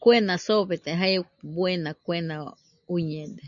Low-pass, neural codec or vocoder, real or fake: 7.2 kHz; none; real